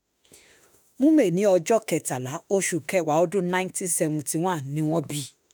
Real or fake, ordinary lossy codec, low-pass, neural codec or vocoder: fake; none; none; autoencoder, 48 kHz, 32 numbers a frame, DAC-VAE, trained on Japanese speech